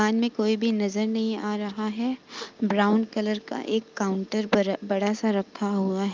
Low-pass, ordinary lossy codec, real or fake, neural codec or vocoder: 7.2 kHz; Opus, 24 kbps; real; none